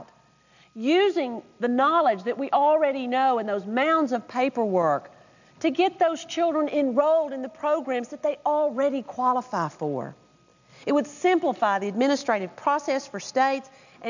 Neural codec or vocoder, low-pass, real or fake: none; 7.2 kHz; real